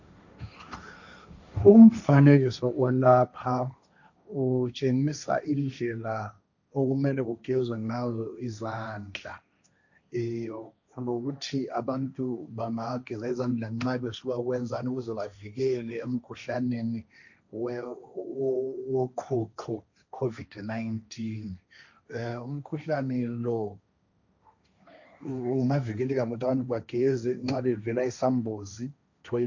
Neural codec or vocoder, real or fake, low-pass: codec, 16 kHz, 1.1 kbps, Voila-Tokenizer; fake; 7.2 kHz